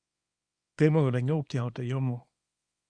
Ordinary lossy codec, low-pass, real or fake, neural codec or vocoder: Opus, 64 kbps; 9.9 kHz; fake; codec, 24 kHz, 0.9 kbps, WavTokenizer, small release